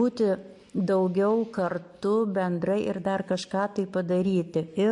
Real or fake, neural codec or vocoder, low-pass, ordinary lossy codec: real; none; 10.8 kHz; MP3, 48 kbps